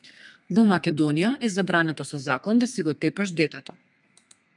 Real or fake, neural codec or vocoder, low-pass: fake; codec, 32 kHz, 1.9 kbps, SNAC; 10.8 kHz